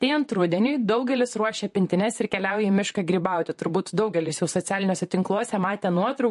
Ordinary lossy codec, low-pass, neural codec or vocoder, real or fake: MP3, 48 kbps; 14.4 kHz; vocoder, 44.1 kHz, 128 mel bands, Pupu-Vocoder; fake